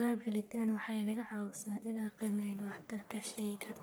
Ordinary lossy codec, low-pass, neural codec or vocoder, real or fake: none; none; codec, 44.1 kHz, 1.7 kbps, Pupu-Codec; fake